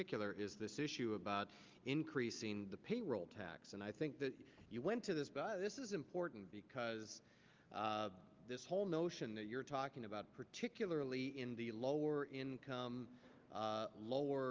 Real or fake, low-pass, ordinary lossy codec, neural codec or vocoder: real; 7.2 kHz; Opus, 32 kbps; none